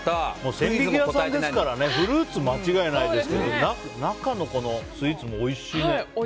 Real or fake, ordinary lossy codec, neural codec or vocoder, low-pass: real; none; none; none